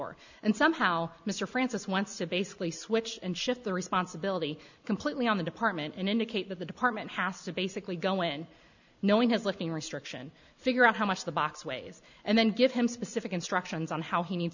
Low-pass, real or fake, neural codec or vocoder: 7.2 kHz; real; none